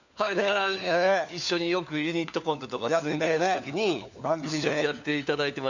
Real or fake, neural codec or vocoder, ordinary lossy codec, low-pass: fake; codec, 16 kHz, 4 kbps, FunCodec, trained on LibriTTS, 50 frames a second; none; 7.2 kHz